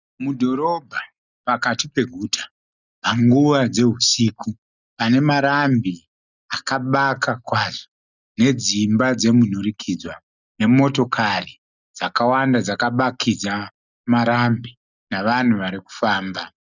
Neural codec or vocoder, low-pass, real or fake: none; 7.2 kHz; real